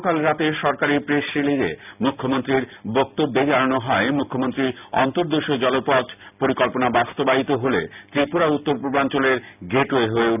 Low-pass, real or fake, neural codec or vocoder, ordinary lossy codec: 3.6 kHz; real; none; none